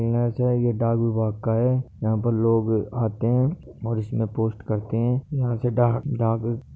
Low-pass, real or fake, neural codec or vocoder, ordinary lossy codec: none; real; none; none